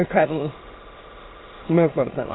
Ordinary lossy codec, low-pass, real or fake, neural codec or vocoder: AAC, 16 kbps; 7.2 kHz; fake; autoencoder, 22.05 kHz, a latent of 192 numbers a frame, VITS, trained on many speakers